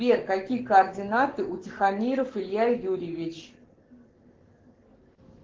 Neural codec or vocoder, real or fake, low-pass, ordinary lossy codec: codec, 44.1 kHz, 7.8 kbps, Pupu-Codec; fake; 7.2 kHz; Opus, 16 kbps